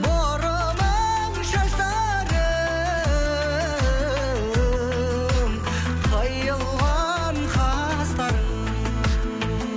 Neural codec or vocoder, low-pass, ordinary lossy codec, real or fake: none; none; none; real